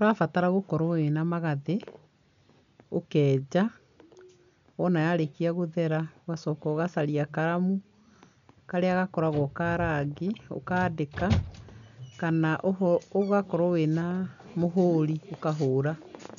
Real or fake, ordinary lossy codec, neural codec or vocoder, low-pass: real; none; none; 7.2 kHz